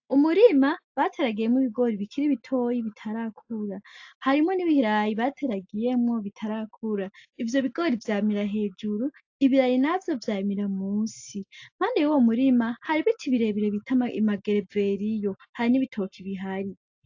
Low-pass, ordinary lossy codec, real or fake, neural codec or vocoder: 7.2 kHz; AAC, 48 kbps; real; none